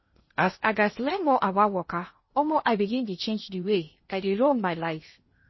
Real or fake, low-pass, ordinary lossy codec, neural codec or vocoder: fake; 7.2 kHz; MP3, 24 kbps; codec, 16 kHz in and 24 kHz out, 0.6 kbps, FocalCodec, streaming, 2048 codes